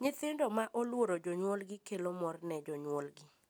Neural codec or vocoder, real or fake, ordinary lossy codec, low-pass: none; real; none; none